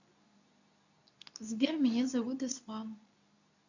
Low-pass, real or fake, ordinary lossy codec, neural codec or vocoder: 7.2 kHz; fake; none; codec, 24 kHz, 0.9 kbps, WavTokenizer, medium speech release version 2